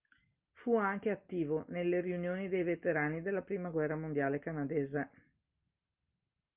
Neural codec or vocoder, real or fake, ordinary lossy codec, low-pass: none; real; Opus, 24 kbps; 3.6 kHz